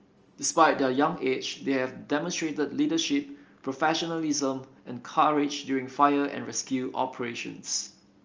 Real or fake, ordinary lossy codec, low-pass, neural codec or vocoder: real; Opus, 24 kbps; 7.2 kHz; none